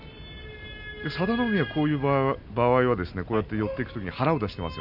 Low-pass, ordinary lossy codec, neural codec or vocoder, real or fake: 5.4 kHz; none; none; real